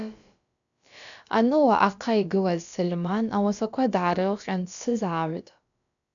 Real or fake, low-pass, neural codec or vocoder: fake; 7.2 kHz; codec, 16 kHz, about 1 kbps, DyCAST, with the encoder's durations